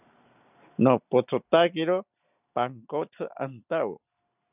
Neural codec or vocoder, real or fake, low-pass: none; real; 3.6 kHz